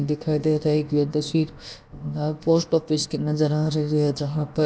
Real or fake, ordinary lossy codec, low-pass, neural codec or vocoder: fake; none; none; codec, 16 kHz, about 1 kbps, DyCAST, with the encoder's durations